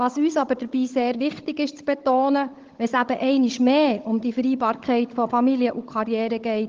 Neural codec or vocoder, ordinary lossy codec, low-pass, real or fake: codec, 16 kHz, 16 kbps, FunCodec, trained on Chinese and English, 50 frames a second; Opus, 16 kbps; 7.2 kHz; fake